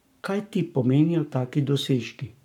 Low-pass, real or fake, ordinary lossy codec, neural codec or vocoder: 19.8 kHz; fake; none; codec, 44.1 kHz, 7.8 kbps, Pupu-Codec